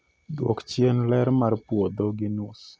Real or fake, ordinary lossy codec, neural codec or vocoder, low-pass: real; none; none; none